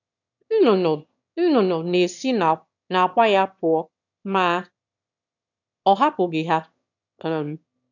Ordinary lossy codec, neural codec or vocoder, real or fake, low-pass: none; autoencoder, 22.05 kHz, a latent of 192 numbers a frame, VITS, trained on one speaker; fake; 7.2 kHz